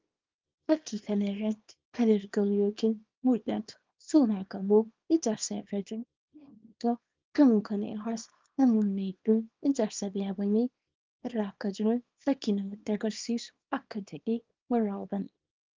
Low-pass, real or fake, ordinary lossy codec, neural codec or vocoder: 7.2 kHz; fake; Opus, 32 kbps; codec, 24 kHz, 0.9 kbps, WavTokenizer, small release